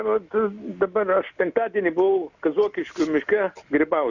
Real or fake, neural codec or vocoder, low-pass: real; none; 7.2 kHz